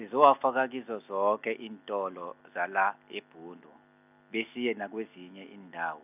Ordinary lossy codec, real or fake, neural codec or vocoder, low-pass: none; real; none; 3.6 kHz